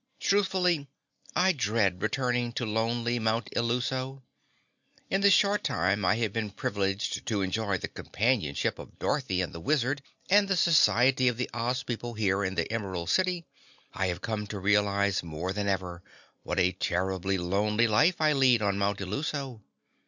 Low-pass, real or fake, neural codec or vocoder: 7.2 kHz; real; none